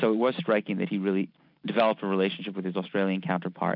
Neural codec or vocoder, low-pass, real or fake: none; 5.4 kHz; real